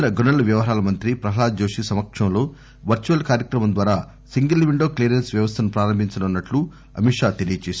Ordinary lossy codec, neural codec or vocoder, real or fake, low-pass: none; none; real; none